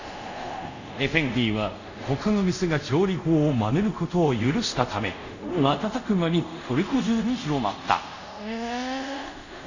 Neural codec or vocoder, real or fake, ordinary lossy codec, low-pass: codec, 24 kHz, 0.5 kbps, DualCodec; fake; none; 7.2 kHz